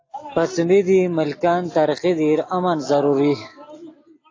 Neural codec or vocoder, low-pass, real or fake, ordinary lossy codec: none; 7.2 kHz; real; AAC, 32 kbps